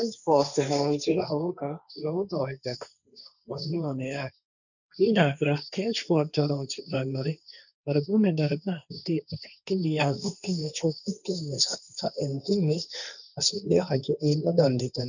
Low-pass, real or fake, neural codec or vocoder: 7.2 kHz; fake; codec, 16 kHz, 1.1 kbps, Voila-Tokenizer